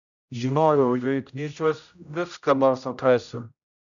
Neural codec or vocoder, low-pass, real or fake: codec, 16 kHz, 0.5 kbps, X-Codec, HuBERT features, trained on general audio; 7.2 kHz; fake